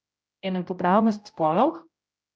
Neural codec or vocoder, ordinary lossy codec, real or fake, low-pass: codec, 16 kHz, 0.5 kbps, X-Codec, HuBERT features, trained on general audio; none; fake; none